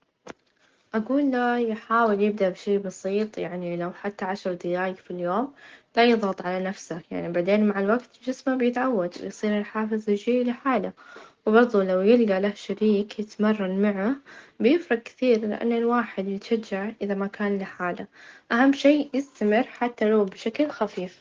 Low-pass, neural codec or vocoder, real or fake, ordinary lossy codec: 7.2 kHz; none; real; Opus, 16 kbps